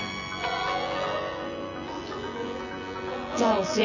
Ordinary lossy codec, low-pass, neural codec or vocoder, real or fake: none; 7.2 kHz; vocoder, 24 kHz, 100 mel bands, Vocos; fake